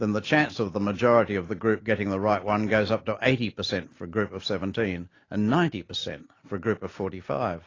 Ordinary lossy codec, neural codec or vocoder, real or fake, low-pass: AAC, 32 kbps; none; real; 7.2 kHz